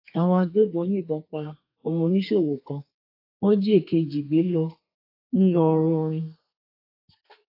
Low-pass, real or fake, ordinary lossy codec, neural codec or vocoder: 5.4 kHz; fake; AAC, 32 kbps; codec, 32 kHz, 1.9 kbps, SNAC